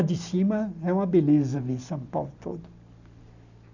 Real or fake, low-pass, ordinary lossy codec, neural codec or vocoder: real; 7.2 kHz; none; none